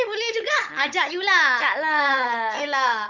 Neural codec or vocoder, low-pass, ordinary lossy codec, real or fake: codec, 16 kHz, 16 kbps, FunCodec, trained on Chinese and English, 50 frames a second; 7.2 kHz; none; fake